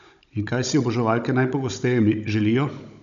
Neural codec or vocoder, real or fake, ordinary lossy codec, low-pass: codec, 16 kHz, 16 kbps, FunCodec, trained on Chinese and English, 50 frames a second; fake; none; 7.2 kHz